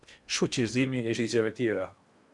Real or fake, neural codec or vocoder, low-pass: fake; codec, 16 kHz in and 24 kHz out, 0.8 kbps, FocalCodec, streaming, 65536 codes; 10.8 kHz